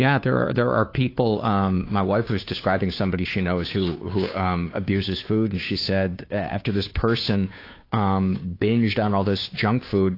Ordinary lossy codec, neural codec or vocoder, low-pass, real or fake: AAC, 32 kbps; codec, 16 kHz, 2 kbps, FunCodec, trained on Chinese and English, 25 frames a second; 5.4 kHz; fake